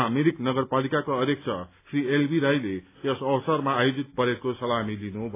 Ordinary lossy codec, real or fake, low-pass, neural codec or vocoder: AAC, 24 kbps; real; 3.6 kHz; none